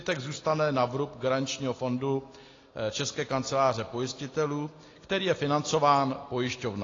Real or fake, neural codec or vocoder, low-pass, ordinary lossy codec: real; none; 7.2 kHz; AAC, 32 kbps